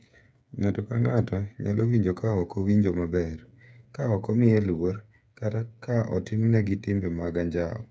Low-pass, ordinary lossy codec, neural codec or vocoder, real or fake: none; none; codec, 16 kHz, 8 kbps, FreqCodec, smaller model; fake